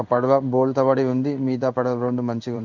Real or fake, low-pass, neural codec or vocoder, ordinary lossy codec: fake; 7.2 kHz; codec, 16 kHz in and 24 kHz out, 1 kbps, XY-Tokenizer; none